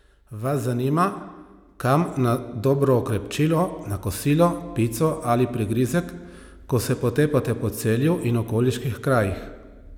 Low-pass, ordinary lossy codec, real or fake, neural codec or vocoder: 19.8 kHz; none; real; none